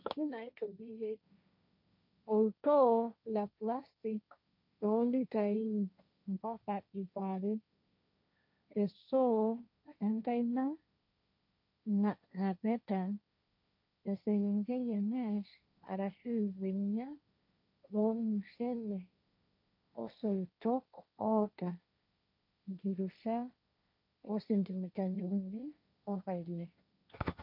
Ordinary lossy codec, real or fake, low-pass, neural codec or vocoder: none; fake; 5.4 kHz; codec, 16 kHz, 1.1 kbps, Voila-Tokenizer